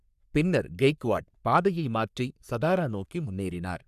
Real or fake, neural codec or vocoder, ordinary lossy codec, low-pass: fake; codec, 44.1 kHz, 3.4 kbps, Pupu-Codec; none; 14.4 kHz